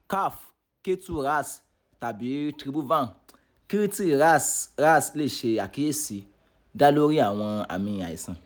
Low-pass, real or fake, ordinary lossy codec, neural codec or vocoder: none; real; none; none